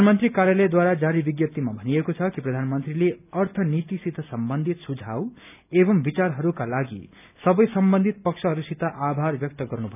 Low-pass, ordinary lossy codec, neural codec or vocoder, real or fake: 3.6 kHz; none; none; real